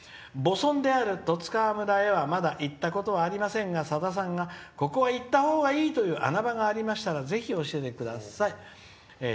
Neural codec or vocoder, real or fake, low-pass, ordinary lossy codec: none; real; none; none